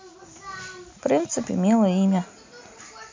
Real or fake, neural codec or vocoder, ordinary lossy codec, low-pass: real; none; MP3, 64 kbps; 7.2 kHz